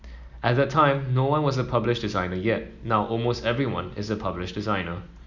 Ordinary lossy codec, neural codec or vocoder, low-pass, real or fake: none; none; 7.2 kHz; real